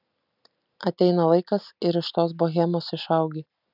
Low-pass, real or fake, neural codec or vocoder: 5.4 kHz; real; none